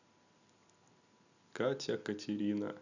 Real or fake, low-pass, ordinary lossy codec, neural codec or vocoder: real; 7.2 kHz; none; none